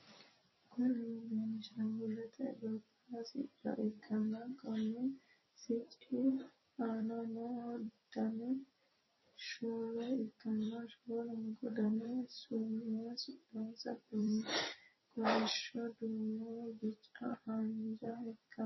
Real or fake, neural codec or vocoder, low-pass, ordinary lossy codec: fake; vocoder, 44.1 kHz, 128 mel bands every 256 samples, BigVGAN v2; 7.2 kHz; MP3, 24 kbps